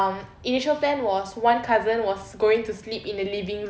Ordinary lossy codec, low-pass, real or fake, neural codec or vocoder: none; none; real; none